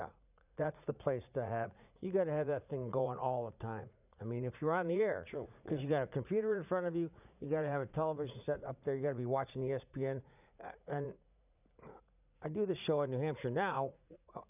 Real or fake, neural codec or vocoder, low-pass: fake; vocoder, 44.1 kHz, 80 mel bands, Vocos; 3.6 kHz